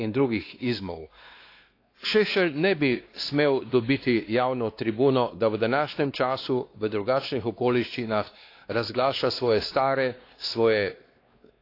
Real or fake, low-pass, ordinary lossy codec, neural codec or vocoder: fake; 5.4 kHz; AAC, 32 kbps; codec, 16 kHz, 2 kbps, X-Codec, WavLM features, trained on Multilingual LibriSpeech